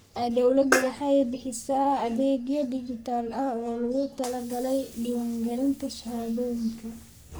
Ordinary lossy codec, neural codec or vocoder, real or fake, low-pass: none; codec, 44.1 kHz, 3.4 kbps, Pupu-Codec; fake; none